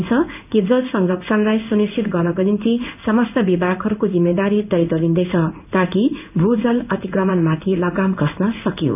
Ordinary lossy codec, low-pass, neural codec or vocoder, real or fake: none; 3.6 kHz; codec, 16 kHz in and 24 kHz out, 1 kbps, XY-Tokenizer; fake